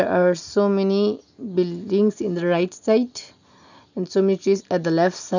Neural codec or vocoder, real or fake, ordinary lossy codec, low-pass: none; real; none; 7.2 kHz